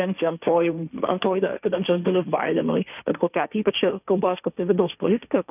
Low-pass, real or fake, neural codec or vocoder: 3.6 kHz; fake; codec, 16 kHz, 1.1 kbps, Voila-Tokenizer